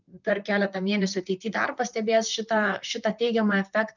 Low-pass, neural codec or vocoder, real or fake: 7.2 kHz; vocoder, 44.1 kHz, 128 mel bands, Pupu-Vocoder; fake